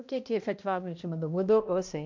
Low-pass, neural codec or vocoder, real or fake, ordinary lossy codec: 7.2 kHz; codec, 16 kHz, 1 kbps, X-Codec, HuBERT features, trained on balanced general audio; fake; MP3, 48 kbps